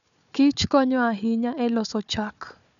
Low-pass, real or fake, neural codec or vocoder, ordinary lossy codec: 7.2 kHz; fake; codec, 16 kHz, 4 kbps, FunCodec, trained on Chinese and English, 50 frames a second; none